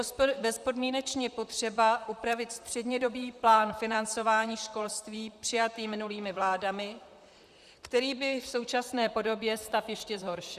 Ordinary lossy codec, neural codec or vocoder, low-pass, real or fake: Opus, 64 kbps; vocoder, 44.1 kHz, 128 mel bands, Pupu-Vocoder; 14.4 kHz; fake